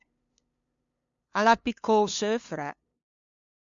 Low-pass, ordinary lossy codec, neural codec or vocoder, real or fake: 7.2 kHz; MP3, 64 kbps; codec, 16 kHz, 2 kbps, FunCodec, trained on LibriTTS, 25 frames a second; fake